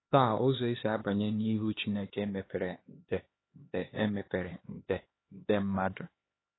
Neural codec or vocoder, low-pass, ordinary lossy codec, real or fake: codec, 16 kHz, 2 kbps, X-Codec, HuBERT features, trained on LibriSpeech; 7.2 kHz; AAC, 16 kbps; fake